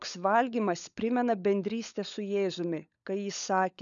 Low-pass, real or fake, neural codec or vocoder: 7.2 kHz; fake; codec, 16 kHz, 16 kbps, FunCodec, trained on LibriTTS, 50 frames a second